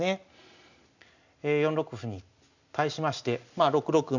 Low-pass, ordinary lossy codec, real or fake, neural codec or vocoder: 7.2 kHz; none; real; none